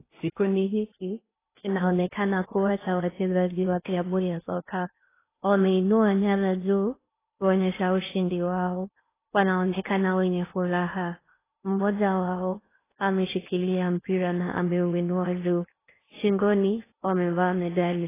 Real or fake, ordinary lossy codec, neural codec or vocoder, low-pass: fake; AAC, 16 kbps; codec, 16 kHz in and 24 kHz out, 0.6 kbps, FocalCodec, streaming, 2048 codes; 3.6 kHz